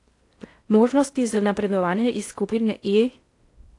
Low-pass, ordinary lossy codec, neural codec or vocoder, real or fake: 10.8 kHz; AAC, 48 kbps; codec, 16 kHz in and 24 kHz out, 0.6 kbps, FocalCodec, streaming, 4096 codes; fake